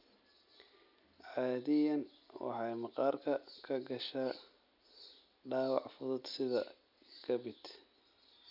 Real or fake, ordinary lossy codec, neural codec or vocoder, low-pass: real; none; none; 5.4 kHz